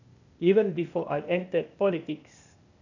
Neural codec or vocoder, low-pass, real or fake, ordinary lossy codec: codec, 16 kHz, 0.8 kbps, ZipCodec; 7.2 kHz; fake; none